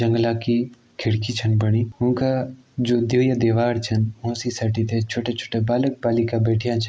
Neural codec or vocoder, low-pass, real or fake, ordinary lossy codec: none; none; real; none